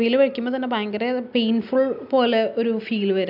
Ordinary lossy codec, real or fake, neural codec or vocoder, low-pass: none; real; none; 5.4 kHz